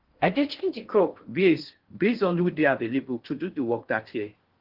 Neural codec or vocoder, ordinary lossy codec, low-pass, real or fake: codec, 16 kHz in and 24 kHz out, 0.6 kbps, FocalCodec, streaming, 4096 codes; Opus, 16 kbps; 5.4 kHz; fake